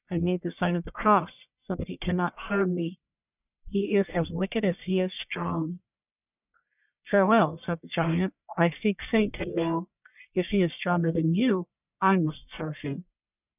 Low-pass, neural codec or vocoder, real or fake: 3.6 kHz; codec, 44.1 kHz, 1.7 kbps, Pupu-Codec; fake